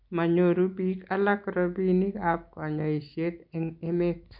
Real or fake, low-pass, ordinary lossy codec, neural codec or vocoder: real; 5.4 kHz; none; none